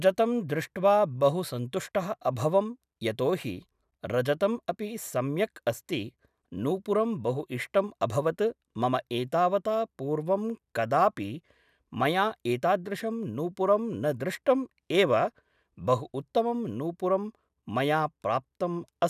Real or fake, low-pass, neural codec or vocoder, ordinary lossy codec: real; 14.4 kHz; none; none